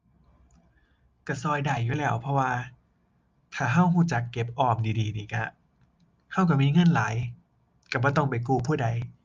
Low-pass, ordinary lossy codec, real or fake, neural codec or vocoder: 7.2 kHz; Opus, 24 kbps; real; none